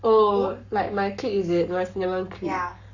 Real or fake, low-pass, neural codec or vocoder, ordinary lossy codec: fake; 7.2 kHz; codec, 44.1 kHz, 7.8 kbps, Pupu-Codec; none